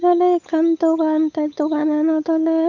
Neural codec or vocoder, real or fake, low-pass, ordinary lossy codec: codec, 16 kHz, 8 kbps, FunCodec, trained on Chinese and English, 25 frames a second; fake; 7.2 kHz; none